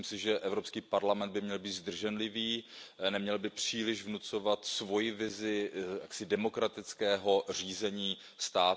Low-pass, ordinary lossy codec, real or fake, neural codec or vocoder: none; none; real; none